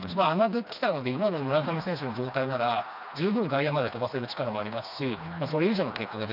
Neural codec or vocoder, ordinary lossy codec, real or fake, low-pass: codec, 16 kHz, 2 kbps, FreqCodec, smaller model; none; fake; 5.4 kHz